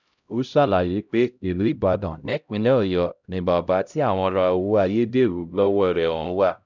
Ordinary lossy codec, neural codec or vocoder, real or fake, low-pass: none; codec, 16 kHz, 0.5 kbps, X-Codec, HuBERT features, trained on LibriSpeech; fake; 7.2 kHz